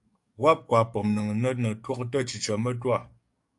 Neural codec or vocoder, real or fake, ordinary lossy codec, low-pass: codec, 44.1 kHz, 7.8 kbps, DAC; fake; AAC, 64 kbps; 10.8 kHz